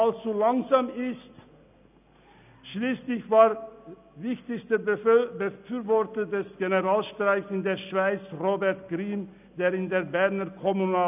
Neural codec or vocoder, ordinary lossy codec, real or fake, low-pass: none; none; real; 3.6 kHz